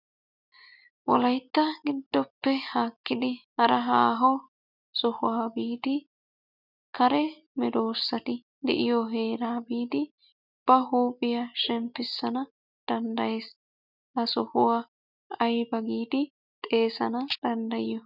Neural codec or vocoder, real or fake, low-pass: none; real; 5.4 kHz